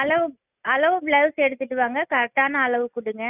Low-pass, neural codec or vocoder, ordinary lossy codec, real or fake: 3.6 kHz; none; none; real